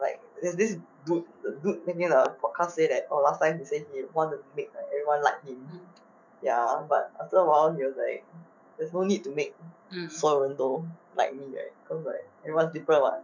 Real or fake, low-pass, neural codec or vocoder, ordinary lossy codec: fake; 7.2 kHz; autoencoder, 48 kHz, 128 numbers a frame, DAC-VAE, trained on Japanese speech; none